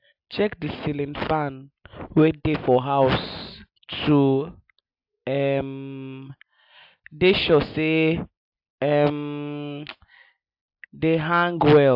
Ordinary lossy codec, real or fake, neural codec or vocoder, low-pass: AAC, 48 kbps; real; none; 5.4 kHz